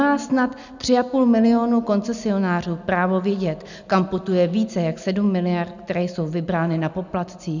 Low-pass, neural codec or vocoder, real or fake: 7.2 kHz; none; real